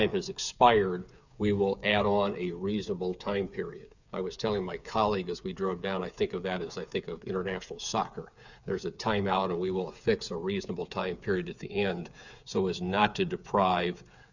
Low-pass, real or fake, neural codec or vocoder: 7.2 kHz; fake; codec, 16 kHz, 8 kbps, FreqCodec, smaller model